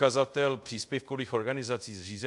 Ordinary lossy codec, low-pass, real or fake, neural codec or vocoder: MP3, 64 kbps; 10.8 kHz; fake; codec, 24 kHz, 0.5 kbps, DualCodec